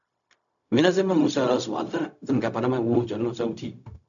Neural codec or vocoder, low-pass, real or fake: codec, 16 kHz, 0.4 kbps, LongCat-Audio-Codec; 7.2 kHz; fake